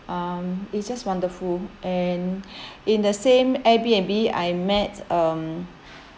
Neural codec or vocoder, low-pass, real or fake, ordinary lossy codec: none; none; real; none